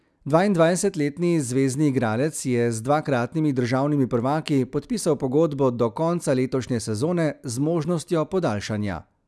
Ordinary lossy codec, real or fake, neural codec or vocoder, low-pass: none; real; none; none